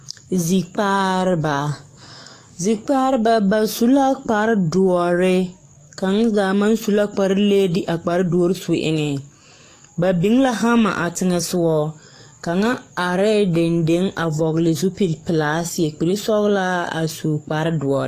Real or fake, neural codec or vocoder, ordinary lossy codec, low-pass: fake; codec, 44.1 kHz, 7.8 kbps, DAC; AAC, 48 kbps; 14.4 kHz